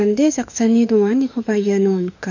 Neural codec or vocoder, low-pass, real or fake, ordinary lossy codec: autoencoder, 48 kHz, 32 numbers a frame, DAC-VAE, trained on Japanese speech; 7.2 kHz; fake; none